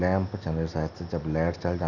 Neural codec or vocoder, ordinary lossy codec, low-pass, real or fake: none; none; 7.2 kHz; real